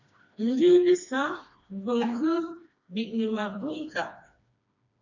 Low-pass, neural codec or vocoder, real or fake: 7.2 kHz; codec, 16 kHz, 2 kbps, FreqCodec, smaller model; fake